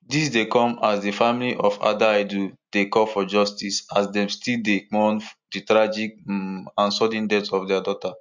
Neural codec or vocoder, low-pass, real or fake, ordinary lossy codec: none; 7.2 kHz; real; MP3, 64 kbps